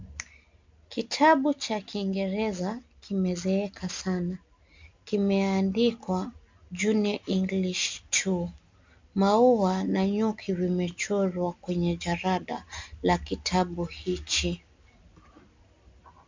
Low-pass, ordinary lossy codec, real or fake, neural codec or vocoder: 7.2 kHz; MP3, 64 kbps; real; none